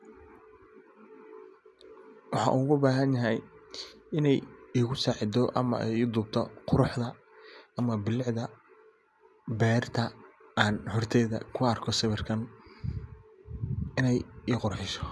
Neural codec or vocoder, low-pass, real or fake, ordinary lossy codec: none; none; real; none